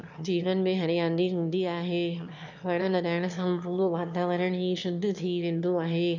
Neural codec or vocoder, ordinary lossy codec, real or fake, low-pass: autoencoder, 22.05 kHz, a latent of 192 numbers a frame, VITS, trained on one speaker; none; fake; 7.2 kHz